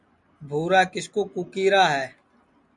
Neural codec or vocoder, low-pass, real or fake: none; 10.8 kHz; real